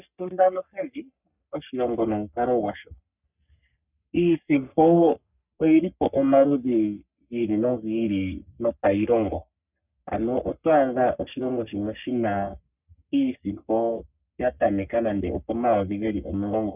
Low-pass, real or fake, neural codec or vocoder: 3.6 kHz; fake; codec, 44.1 kHz, 3.4 kbps, Pupu-Codec